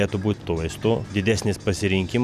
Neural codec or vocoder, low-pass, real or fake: none; 14.4 kHz; real